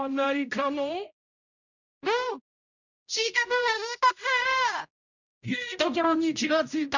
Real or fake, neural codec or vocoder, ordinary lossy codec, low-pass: fake; codec, 16 kHz, 0.5 kbps, X-Codec, HuBERT features, trained on general audio; none; 7.2 kHz